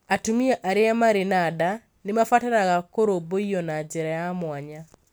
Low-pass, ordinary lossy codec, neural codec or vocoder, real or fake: none; none; none; real